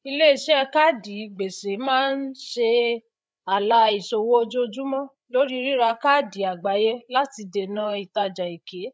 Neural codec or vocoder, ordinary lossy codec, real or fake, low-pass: codec, 16 kHz, 8 kbps, FreqCodec, larger model; none; fake; none